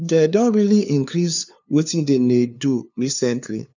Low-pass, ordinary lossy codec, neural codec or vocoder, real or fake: 7.2 kHz; none; codec, 16 kHz, 2 kbps, FunCodec, trained on LibriTTS, 25 frames a second; fake